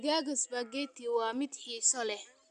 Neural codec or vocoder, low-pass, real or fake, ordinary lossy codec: none; 9.9 kHz; real; none